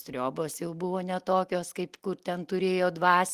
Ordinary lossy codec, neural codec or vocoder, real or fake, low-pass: Opus, 24 kbps; none; real; 14.4 kHz